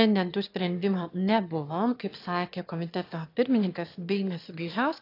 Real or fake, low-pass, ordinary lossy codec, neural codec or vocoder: fake; 5.4 kHz; AAC, 32 kbps; autoencoder, 22.05 kHz, a latent of 192 numbers a frame, VITS, trained on one speaker